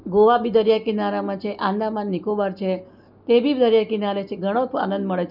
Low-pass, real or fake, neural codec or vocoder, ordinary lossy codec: 5.4 kHz; real; none; none